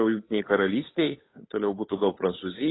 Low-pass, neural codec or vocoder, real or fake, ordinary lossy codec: 7.2 kHz; codec, 16 kHz, 8 kbps, FunCodec, trained on Chinese and English, 25 frames a second; fake; AAC, 16 kbps